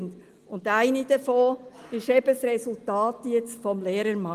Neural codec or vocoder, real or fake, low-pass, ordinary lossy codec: none; real; 14.4 kHz; Opus, 24 kbps